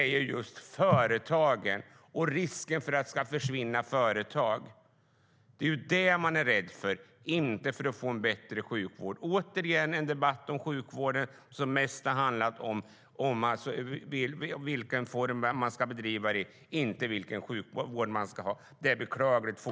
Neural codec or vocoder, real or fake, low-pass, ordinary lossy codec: none; real; none; none